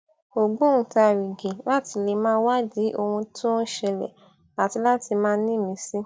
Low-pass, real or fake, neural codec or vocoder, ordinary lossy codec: none; real; none; none